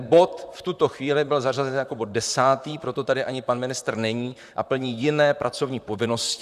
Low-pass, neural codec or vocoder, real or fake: 14.4 kHz; vocoder, 44.1 kHz, 128 mel bands, Pupu-Vocoder; fake